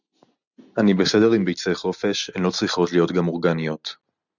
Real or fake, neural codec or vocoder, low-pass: real; none; 7.2 kHz